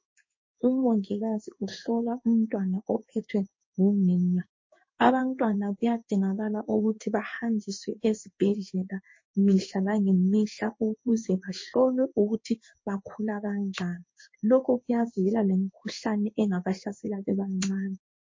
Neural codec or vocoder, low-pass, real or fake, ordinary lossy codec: codec, 16 kHz in and 24 kHz out, 1 kbps, XY-Tokenizer; 7.2 kHz; fake; MP3, 32 kbps